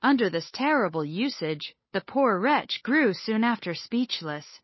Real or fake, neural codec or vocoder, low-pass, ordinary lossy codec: fake; codec, 16 kHz, 8 kbps, FunCodec, trained on Chinese and English, 25 frames a second; 7.2 kHz; MP3, 24 kbps